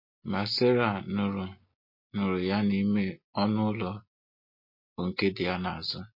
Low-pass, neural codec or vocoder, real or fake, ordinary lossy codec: 5.4 kHz; none; real; MP3, 32 kbps